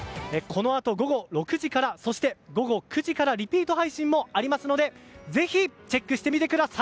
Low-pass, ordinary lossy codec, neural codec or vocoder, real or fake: none; none; none; real